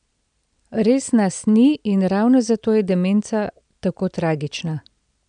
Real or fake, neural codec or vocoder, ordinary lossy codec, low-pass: real; none; none; 9.9 kHz